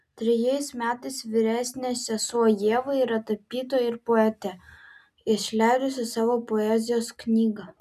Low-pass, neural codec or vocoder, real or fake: 14.4 kHz; none; real